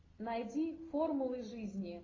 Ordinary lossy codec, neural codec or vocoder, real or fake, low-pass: AAC, 32 kbps; none; real; 7.2 kHz